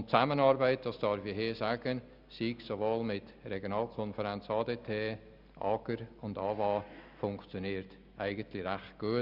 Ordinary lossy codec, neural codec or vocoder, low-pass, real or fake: none; none; 5.4 kHz; real